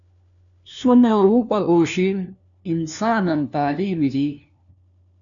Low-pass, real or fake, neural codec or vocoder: 7.2 kHz; fake; codec, 16 kHz, 1 kbps, FunCodec, trained on LibriTTS, 50 frames a second